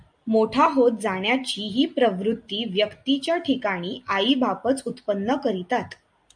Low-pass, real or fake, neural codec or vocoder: 9.9 kHz; real; none